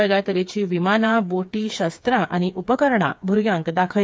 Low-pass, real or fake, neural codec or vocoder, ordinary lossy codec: none; fake; codec, 16 kHz, 4 kbps, FreqCodec, smaller model; none